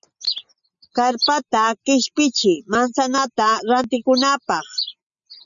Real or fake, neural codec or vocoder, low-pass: real; none; 7.2 kHz